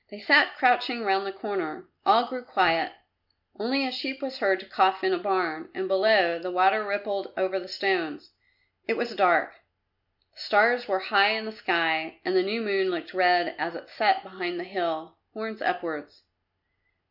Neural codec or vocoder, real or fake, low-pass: none; real; 5.4 kHz